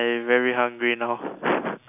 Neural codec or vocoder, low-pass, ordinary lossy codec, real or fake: none; 3.6 kHz; none; real